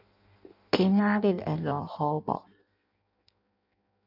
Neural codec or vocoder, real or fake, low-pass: codec, 16 kHz in and 24 kHz out, 0.6 kbps, FireRedTTS-2 codec; fake; 5.4 kHz